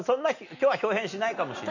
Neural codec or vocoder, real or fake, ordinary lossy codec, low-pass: none; real; none; 7.2 kHz